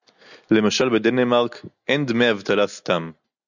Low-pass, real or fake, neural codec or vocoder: 7.2 kHz; real; none